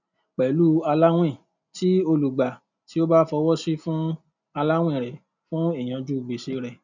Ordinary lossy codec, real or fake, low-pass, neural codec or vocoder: none; real; 7.2 kHz; none